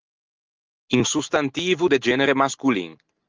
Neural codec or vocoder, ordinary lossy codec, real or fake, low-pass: none; Opus, 24 kbps; real; 7.2 kHz